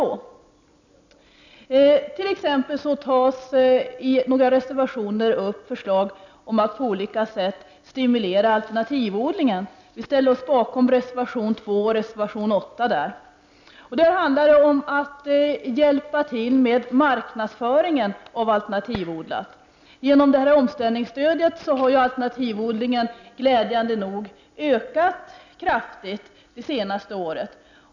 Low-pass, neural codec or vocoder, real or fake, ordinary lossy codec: 7.2 kHz; none; real; none